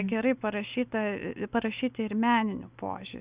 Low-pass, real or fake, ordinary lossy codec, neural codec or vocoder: 3.6 kHz; real; Opus, 64 kbps; none